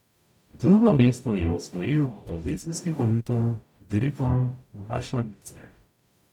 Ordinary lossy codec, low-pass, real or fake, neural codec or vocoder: none; 19.8 kHz; fake; codec, 44.1 kHz, 0.9 kbps, DAC